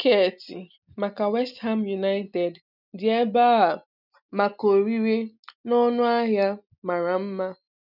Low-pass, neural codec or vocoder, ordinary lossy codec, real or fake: 5.4 kHz; none; none; real